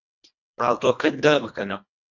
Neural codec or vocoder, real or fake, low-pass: codec, 24 kHz, 1.5 kbps, HILCodec; fake; 7.2 kHz